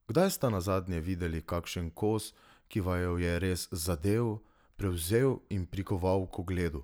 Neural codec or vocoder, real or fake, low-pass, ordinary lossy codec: none; real; none; none